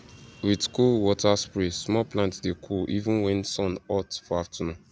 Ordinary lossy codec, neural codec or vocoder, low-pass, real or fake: none; none; none; real